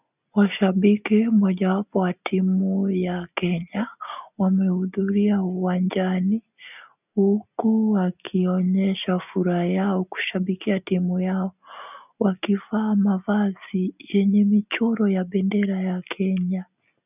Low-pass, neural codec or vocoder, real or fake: 3.6 kHz; none; real